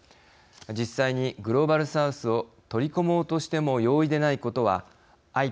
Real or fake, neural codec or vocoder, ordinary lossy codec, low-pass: real; none; none; none